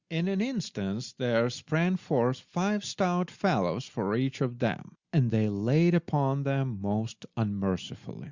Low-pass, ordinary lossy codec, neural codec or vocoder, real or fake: 7.2 kHz; Opus, 64 kbps; none; real